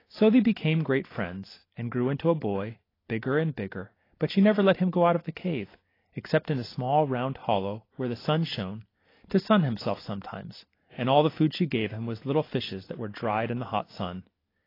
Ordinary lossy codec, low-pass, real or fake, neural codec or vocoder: AAC, 24 kbps; 5.4 kHz; real; none